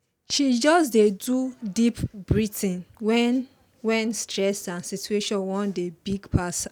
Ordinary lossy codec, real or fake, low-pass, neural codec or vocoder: none; real; none; none